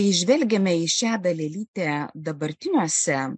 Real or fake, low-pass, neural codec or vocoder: real; 9.9 kHz; none